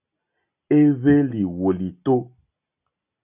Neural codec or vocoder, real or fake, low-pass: none; real; 3.6 kHz